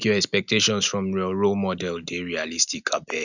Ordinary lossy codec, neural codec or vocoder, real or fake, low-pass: none; none; real; 7.2 kHz